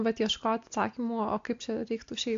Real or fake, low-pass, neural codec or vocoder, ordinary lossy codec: real; 7.2 kHz; none; AAC, 48 kbps